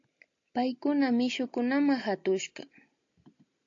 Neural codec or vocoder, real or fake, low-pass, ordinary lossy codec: none; real; 7.2 kHz; AAC, 48 kbps